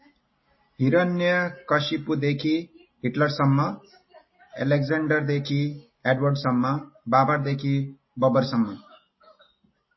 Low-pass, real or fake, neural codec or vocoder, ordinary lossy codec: 7.2 kHz; real; none; MP3, 24 kbps